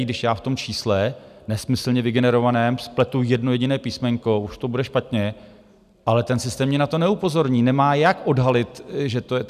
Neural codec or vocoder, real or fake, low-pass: none; real; 14.4 kHz